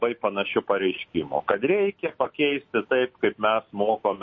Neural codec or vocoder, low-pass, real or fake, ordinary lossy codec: none; 7.2 kHz; real; MP3, 32 kbps